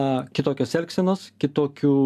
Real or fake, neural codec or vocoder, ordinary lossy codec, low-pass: real; none; AAC, 96 kbps; 14.4 kHz